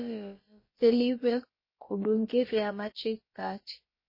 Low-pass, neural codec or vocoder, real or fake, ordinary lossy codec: 5.4 kHz; codec, 16 kHz, about 1 kbps, DyCAST, with the encoder's durations; fake; MP3, 24 kbps